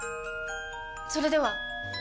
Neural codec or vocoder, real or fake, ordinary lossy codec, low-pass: none; real; none; none